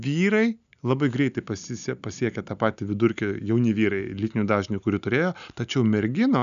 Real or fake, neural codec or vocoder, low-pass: real; none; 7.2 kHz